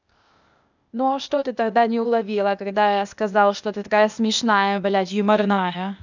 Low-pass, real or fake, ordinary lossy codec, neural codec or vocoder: 7.2 kHz; fake; none; codec, 16 kHz, 0.8 kbps, ZipCodec